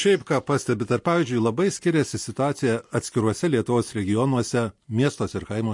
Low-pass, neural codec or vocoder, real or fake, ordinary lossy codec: 10.8 kHz; none; real; MP3, 48 kbps